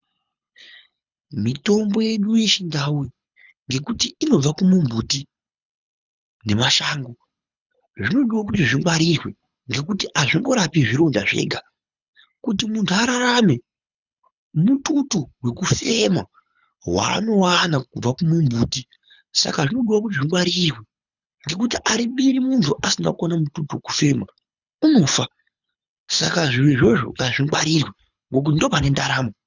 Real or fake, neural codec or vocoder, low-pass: fake; codec, 24 kHz, 6 kbps, HILCodec; 7.2 kHz